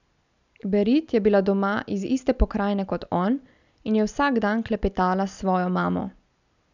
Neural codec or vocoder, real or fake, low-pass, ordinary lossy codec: none; real; 7.2 kHz; none